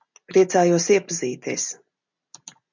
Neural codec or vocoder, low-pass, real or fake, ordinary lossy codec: none; 7.2 kHz; real; MP3, 64 kbps